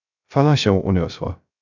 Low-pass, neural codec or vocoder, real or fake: 7.2 kHz; codec, 16 kHz, 0.3 kbps, FocalCodec; fake